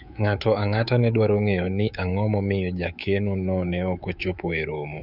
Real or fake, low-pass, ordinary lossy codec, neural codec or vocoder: fake; 5.4 kHz; none; codec, 24 kHz, 3.1 kbps, DualCodec